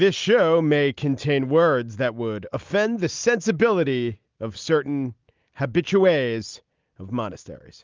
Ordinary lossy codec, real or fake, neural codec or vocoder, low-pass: Opus, 24 kbps; real; none; 7.2 kHz